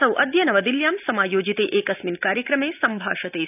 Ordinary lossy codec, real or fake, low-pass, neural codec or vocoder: none; real; 3.6 kHz; none